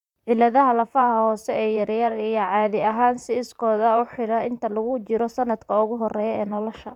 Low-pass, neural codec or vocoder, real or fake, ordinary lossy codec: 19.8 kHz; vocoder, 44.1 kHz, 128 mel bands, Pupu-Vocoder; fake; none